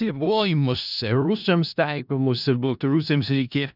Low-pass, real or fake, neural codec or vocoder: 5.4 kHz; fake; codec, 16 kHz in and 24 kHz out, 0.4 kbps, LongCat-Audio-Codec, four codebook decoder